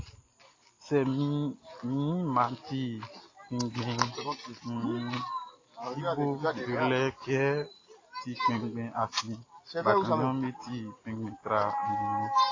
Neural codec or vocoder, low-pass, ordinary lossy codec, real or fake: none; 7.2 kHz; AAC, 32 kbps; real